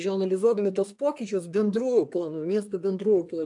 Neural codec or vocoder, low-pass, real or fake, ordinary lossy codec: codec, 24 kHz, 1 kbps, SNAC; 10.8 kHz; fake; AAC, 64 kbps